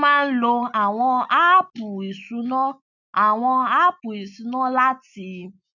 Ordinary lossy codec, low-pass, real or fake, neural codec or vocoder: none; 7.2 kHz; real; none